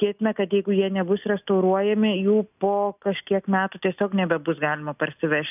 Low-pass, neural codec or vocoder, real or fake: 3.6 kHz; none; real